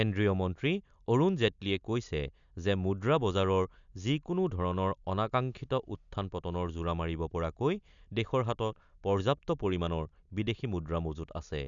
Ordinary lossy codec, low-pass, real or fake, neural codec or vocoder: none; 7.2 kHz; real; none